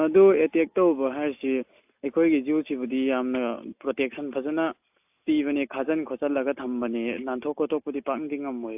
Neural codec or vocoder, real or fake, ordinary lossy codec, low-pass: none; real; AAC, 32 kbps; 3.6 kHz